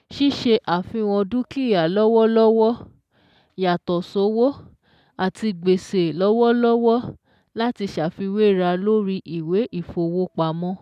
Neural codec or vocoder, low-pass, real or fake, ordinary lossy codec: none; 14.4 kHz; real; none